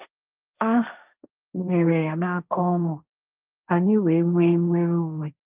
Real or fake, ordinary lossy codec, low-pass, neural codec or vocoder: fake; Opus, 24 kbps; 3.6 kHz; codec, 16 kHz, 1.1 kbps, Voila-Tokenizer